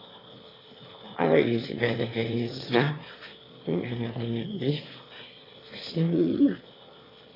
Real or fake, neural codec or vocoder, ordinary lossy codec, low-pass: fake; autoencoder, 22.05 kHz, a latent of 192 numbers a frame, VITS, trained on one speaker; AAC, 24 kbps; 5.4 kHz